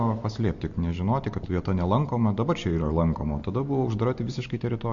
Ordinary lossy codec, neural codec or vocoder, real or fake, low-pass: MP3, 48 kbps; none; real; 7.2 kHz